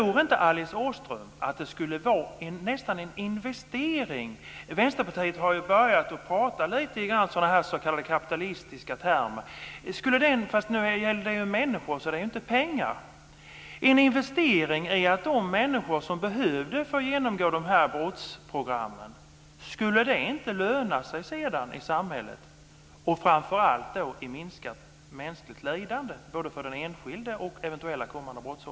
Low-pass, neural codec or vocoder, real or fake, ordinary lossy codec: none; none; real; none